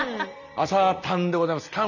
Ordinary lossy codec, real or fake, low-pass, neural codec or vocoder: none; real; 7.2 kHz; none